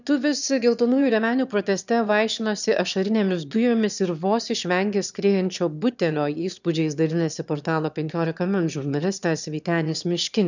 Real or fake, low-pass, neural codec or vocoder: fake; 7.2 kHz; autoencoder, 22.05 kHz, a latent of 192 numbers a frame, VITS, trained on one speaker